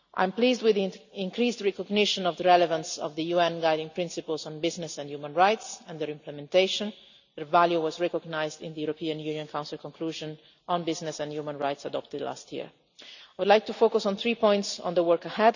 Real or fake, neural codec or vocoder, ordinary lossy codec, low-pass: real; none; none; 7.2 kHz